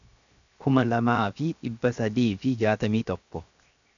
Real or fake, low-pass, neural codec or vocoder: fake; 7.2 kHz; codec, 16 kHz, 0.7 kbps, FocalCodec